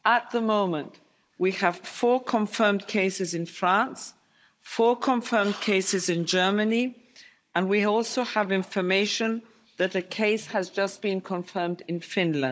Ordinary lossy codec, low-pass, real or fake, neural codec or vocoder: none; none; fake; codec, 16 kHz, 16 kbps, FunCodec, trained on Chinese and English, 50 frames a second